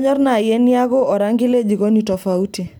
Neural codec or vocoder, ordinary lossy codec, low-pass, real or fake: none; none; none; real